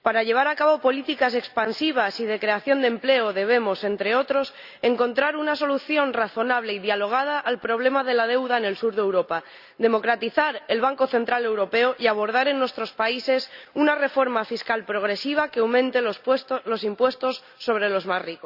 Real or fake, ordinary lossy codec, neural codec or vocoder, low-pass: real; Opus, 64 kbps; none; 5.4 kHz